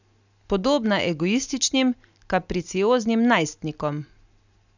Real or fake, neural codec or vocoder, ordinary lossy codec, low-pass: real; none; none; 7.2 kHz